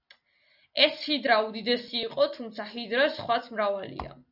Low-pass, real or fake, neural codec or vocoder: 5.4 kHz; real; none